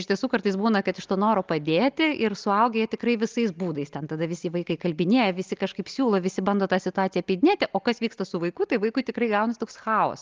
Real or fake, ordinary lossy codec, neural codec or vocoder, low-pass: real; Opus, 32 kbps; none; 7.2 kHz